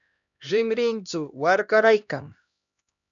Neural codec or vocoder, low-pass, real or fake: codec, 16 kHz, 1 kbps, X-Codec, HuBERT features, trained on LibriSpeech; 7.2 kHz; fake